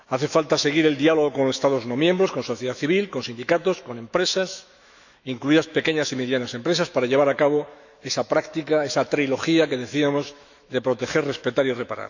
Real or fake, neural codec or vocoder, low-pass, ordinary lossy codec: fake; autoencoder, 48 kHz, 128 numbers a frame, DAC-VAE, trained on Japanese speech; 7.2 kHz; none